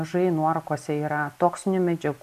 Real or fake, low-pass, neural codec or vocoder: real; 14.4 kHz; none